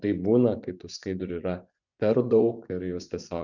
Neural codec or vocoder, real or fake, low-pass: vocoder, 24 kHz, 100 mel bands, Vocos; fake; 7.2 kHz